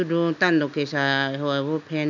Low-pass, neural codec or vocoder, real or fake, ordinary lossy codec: 7.2 kHz; none; real; none